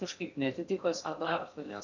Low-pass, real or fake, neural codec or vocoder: 7.2 kHz; fake; codec, 16 kHz in and 24 kHz out, 0.6 kbps, FocalCodec, streaming, 2048 codes